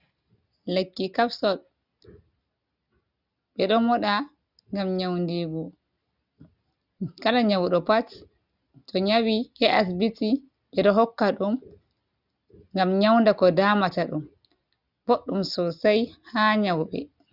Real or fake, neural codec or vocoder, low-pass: real; none; 5.4 kHz